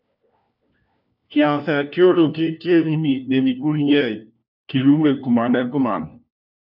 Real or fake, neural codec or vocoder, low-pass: fake; codec, 16 kHz, 1 kbps, FunCodec, trained on LibriTTS, 50 frames a second; 5.4 kHz